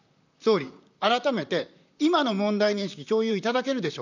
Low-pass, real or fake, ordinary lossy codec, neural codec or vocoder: 7.2 kHz; fake; none; vocoder, 44.1 kHz, 128 mel bands, Pupu-Vocoder